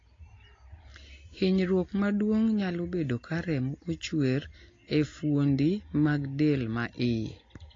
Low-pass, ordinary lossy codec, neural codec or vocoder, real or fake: 7.2 kHz; AAC, 32 kbps; none; real